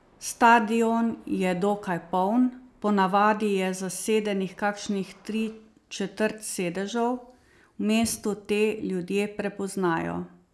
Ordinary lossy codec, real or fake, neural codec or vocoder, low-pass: none; real; none; none